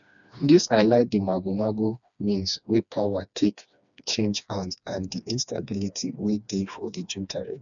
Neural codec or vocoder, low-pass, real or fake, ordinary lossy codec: codec, 16 kHz, 2 kbps, FreqCodec, smaller model; 7.2 kHz; fake; none